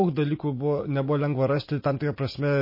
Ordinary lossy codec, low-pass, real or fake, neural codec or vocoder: MP3, 24 kbps; 5.4 kHz; real; none